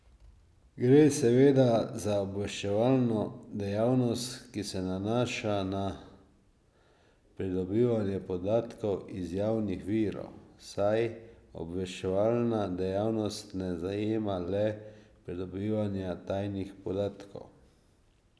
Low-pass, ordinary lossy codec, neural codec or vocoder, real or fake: none; none; none; real